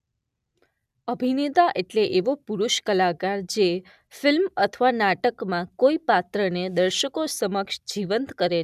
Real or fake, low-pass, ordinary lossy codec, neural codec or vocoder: real; 14.4 kHz; none; none